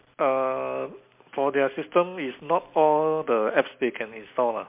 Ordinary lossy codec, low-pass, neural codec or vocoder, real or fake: MP3, 32 kbps; 3.6 kHz; none; real